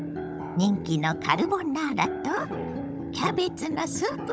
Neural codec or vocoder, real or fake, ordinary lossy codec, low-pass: codec, 16 kHz, 16 kbps, FunCodec, trained on Chinese and English, 50 frames a second; fake; none; none